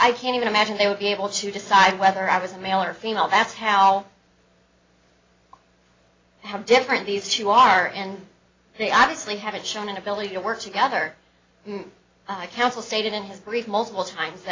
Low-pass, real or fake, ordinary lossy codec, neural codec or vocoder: 7.2 kHz; real; AAC, 32 kbps; none